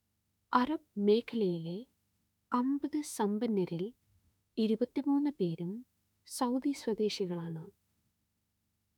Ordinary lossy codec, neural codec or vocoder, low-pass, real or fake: none; autoencoder, 48 kHz, 32 numbers a frame, DAC-VAE, trained on Japanese speech; 19.8 kHz; fake